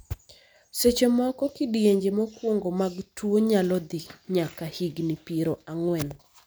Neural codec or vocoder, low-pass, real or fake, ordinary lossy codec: none; none; real; none